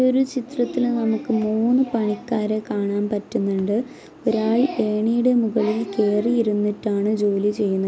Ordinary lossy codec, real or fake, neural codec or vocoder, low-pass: none; real; none; none